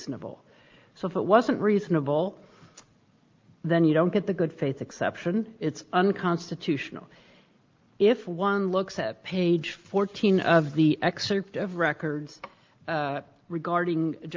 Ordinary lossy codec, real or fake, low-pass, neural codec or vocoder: Opus, 24 kbps; real; 7.2 kHz; none